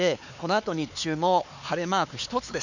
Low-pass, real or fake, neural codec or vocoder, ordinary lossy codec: 7.2 kHz; fake; codec, 16 kHz, 4 kbps, X-Codec, HuBERT features, trained on LibriSpeech; none